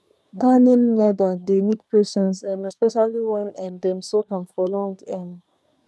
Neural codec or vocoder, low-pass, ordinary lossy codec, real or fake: codec, 24 kHz, 1 kbps, SNAC; none; none; fake